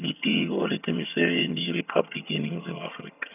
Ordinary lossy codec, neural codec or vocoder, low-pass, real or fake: none; vocoder, 22.05 kHz, 80 mel bands, HiFi-GAN; 3.6 kHz; fake